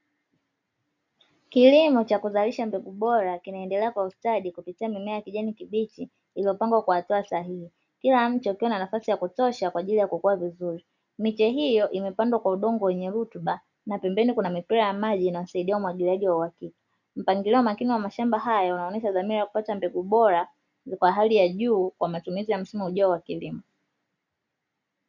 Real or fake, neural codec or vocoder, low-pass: real; none; 7.2 kHz